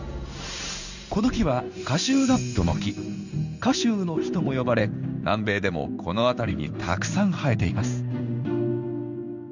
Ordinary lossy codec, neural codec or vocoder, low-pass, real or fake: none; codec, 16 kHz in and 24 kHz out, 1 kbps, XY-Tokenizer; 7.2 kHz; fake